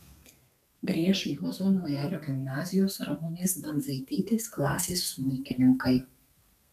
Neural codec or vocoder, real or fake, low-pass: codec, 32 kHz, 1.9 kbps, SNAC; fake; 14.4 kHz